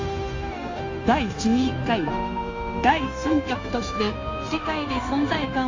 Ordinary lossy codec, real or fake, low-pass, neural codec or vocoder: AAC, 48 kbps; fake; 7.2 kHz; codec, 16 kHz, 0.9 kbps, LongCat-Audio-Codec